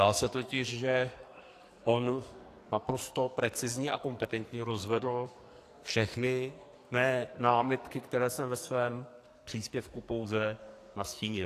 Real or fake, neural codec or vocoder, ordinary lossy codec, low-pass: fake; codec, 44.1 kHz, 2.6 kbps, SNAC; AAC, 64 kbps; 14.4 kHz